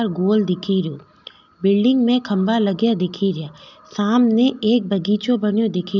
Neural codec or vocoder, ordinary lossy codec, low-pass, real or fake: none; none; 7.2 kHz; real